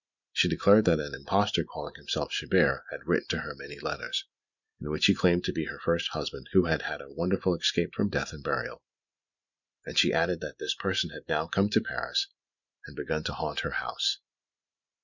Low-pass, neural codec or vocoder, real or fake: 7.2 kHz; vocoder, 44.1 kHz, 128 mel bands every 512 samples, BigVGAN v2; fake